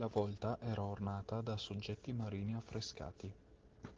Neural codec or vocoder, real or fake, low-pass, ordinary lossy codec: none; real; 7.2 kHz; Opus, 16 kbps